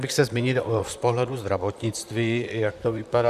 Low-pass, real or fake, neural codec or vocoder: 14.4 kHz; fake; vocoder, 44.1 kHz, 128 mel bands, Pupu-Vocoder